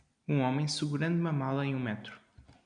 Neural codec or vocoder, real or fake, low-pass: none; real; 9.9 kHz